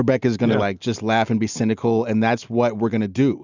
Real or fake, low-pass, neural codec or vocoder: real; 7.2 kHz; none